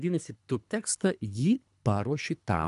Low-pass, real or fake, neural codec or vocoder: 10.8 kHz; fake; codec, 24 kHz, 3 kbps, HILCodec